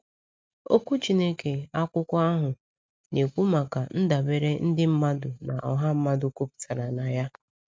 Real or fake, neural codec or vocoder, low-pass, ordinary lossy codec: real; none; none; none